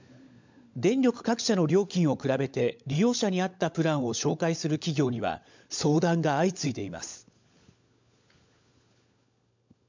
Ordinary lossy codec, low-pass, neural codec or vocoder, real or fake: MP3, 64 kbps; 7.2 kHz; codec, 16 kHz, 16 kbps, FunCodec, trained on LibriTTS, 50 frames a second; fake